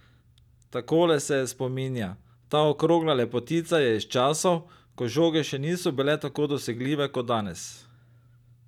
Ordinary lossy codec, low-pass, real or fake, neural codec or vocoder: none; 19.8 kHz; real; none